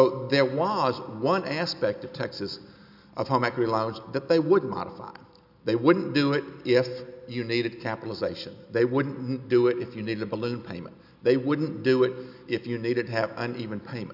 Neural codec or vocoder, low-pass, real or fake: none; 5.4 kHz; real